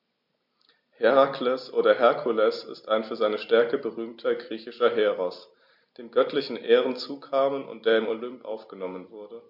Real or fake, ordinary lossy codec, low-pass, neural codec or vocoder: real; MP3, 48 kbps; 5.4 kHz; none